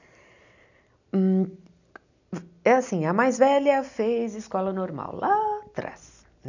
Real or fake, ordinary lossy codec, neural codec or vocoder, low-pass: real; none; none; 7.2 kHz